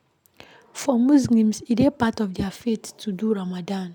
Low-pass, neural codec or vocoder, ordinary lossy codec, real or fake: 19.8 kHz; none; none; real